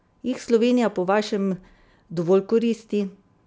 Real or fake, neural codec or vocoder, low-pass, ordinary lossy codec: real; none; none; none